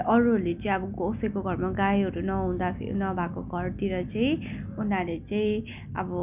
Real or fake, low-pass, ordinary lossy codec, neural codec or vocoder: real; 3.6 kHz; none; none